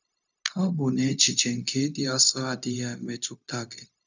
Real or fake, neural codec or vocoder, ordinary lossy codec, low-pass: fake; codec, 16 kHz, 0.4 kbps, LongCat-Audio-Codec; none; 7.2 kHz